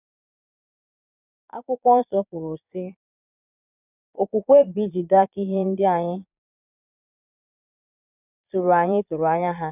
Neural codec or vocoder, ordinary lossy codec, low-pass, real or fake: vocoder, 22.05 kHz, 80 mel bands, Vocos; none; 3.6 kHz; fake